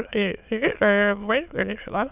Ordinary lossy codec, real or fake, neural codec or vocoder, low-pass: none; fake; autoencoder, 22.05 kHz, a latent of 192 numbers a frame, VITS, trained on many speakers; 3.6 kHz